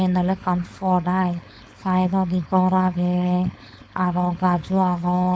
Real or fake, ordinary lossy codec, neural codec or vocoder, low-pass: fake; none; codec, 16 kHz, 4.8 kbps, FACodec; none